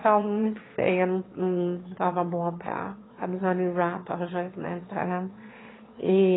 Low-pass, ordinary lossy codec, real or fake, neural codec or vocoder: 7.2 kHz; AAC, 16 kbps; fake; autoencoder, 22.05 kHz, a latent of 192 numbers a frame, VITS, trained on one speaker